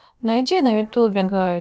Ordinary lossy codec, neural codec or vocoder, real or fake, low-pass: none; codec, 16 kHz, about 1 kbps, DyCAST, with the encoder's durations; fake; none